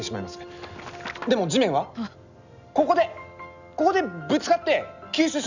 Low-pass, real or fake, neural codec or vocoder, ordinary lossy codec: 7.2 kHz; real; none; none